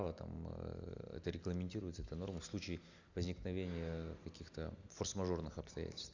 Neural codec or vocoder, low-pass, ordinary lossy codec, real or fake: none; 7.2 kHz; Opus, 64 kbps; real